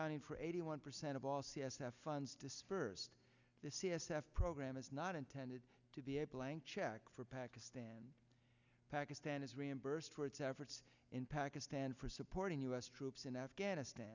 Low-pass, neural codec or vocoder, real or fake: 7.2 kHz; none; real